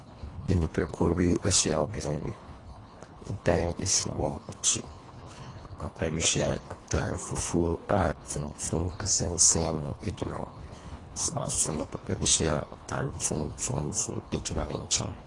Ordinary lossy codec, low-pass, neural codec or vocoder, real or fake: AAC, 32 kbps; 10.8 kHz; codec, 24 kHz, 1.5 kbps, HILCodec; fake